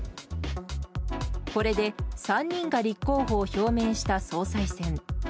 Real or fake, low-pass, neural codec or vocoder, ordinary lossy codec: real; none; none; none